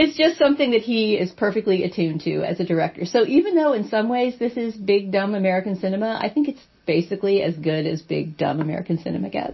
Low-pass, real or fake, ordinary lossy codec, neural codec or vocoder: 7.2 kHz; real; MP3, 24 kbps; none